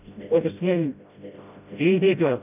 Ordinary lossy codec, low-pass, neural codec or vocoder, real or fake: none; 3.6 kHz; codec, 16 kHz, 0.5 kbps, FreqCodec, smaller model; fake